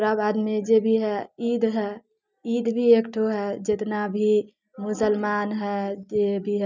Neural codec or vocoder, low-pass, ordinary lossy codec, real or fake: none; 7.2 kHz; none; real